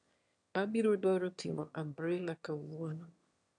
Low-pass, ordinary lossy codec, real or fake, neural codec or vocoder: 9.9 kHz; AAC, 64 kbps; fake; autoencoder, 22.05 kHz, a latent of 192 numbers a frame, VITS, trained on one speaker